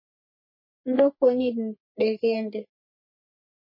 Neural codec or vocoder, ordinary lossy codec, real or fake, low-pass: codec, 44.1 kHz, 3.4 kbps, Pupu-Codec; MP3, 24 kbps; fake; 5.4 kHz